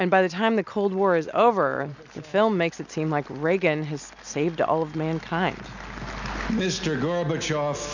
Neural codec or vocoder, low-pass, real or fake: none; 7.2 kHz; real